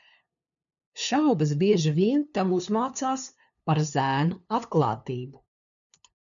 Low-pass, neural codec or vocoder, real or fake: 7.2 kHz; codec, 16 kHz, 2 kbps, FunCodec, trained on LibriTTS, 25 frames a second; fake